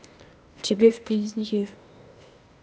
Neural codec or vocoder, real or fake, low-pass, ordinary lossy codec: codec, 16 kHz, 0.8 kbps, ZipCodec; fake; none; none